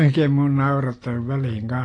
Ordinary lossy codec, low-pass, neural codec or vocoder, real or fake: AAC, 32 kbps; 9.9 kHz; none; real